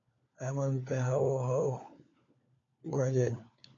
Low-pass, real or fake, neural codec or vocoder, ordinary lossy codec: 7.2 kHz; fake; codec, 16 kHz, 4 kbps, FunCodec, trained on LibriTTS, 50 frames a second; MP3, 48 kbps